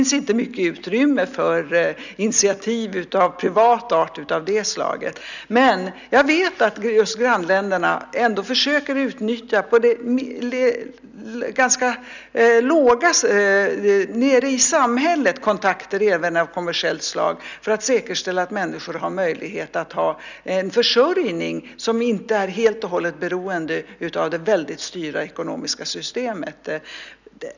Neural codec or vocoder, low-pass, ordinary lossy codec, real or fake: none; 7.2 kHz; none; real